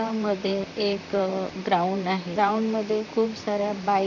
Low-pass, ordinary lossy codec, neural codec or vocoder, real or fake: 7.2 kHz; none; vocoder, 44.1 kHz, 128 mel bands, Pupu-Vocoder; fake